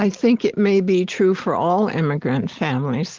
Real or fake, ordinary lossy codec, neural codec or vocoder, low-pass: real; Opus, 16 kbps; none; 7.2 kHz